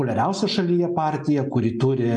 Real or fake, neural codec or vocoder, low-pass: real; none; 10.8 kHz